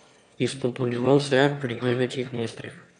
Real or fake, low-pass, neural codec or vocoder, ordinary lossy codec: fake; 9.9 kHz; autoencoder, 22.05 kHz, a latent of 192 numbers a frame, VITS, trained on one speaker; none